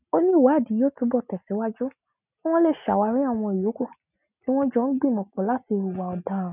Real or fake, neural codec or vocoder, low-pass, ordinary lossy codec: real; none; 3.6 kHz; none